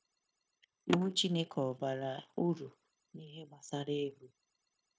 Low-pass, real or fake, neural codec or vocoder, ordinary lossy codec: none; fake; codec, 16 kHz, 0.9 kbps, LongCat-Audio-Codec; none